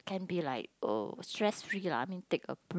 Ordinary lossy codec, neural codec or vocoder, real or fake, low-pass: none; none; real; none